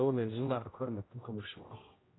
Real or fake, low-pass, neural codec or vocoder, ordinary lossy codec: fake; 7.2 kHz; codec, 16 kHz, 0.5 kbps, X-Codec, HuBERT features, trained on general audio; AAC, 16 kbps